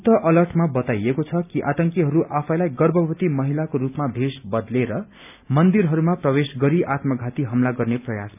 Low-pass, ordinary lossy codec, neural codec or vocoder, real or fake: 3.6 kHz; none; none; real